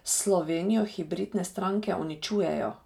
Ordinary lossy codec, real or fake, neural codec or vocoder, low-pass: none; real; none; 19.8 kHz